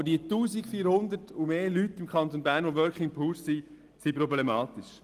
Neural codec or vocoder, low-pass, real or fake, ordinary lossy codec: none; 14.4 kHz; real; Opus, 24 kbps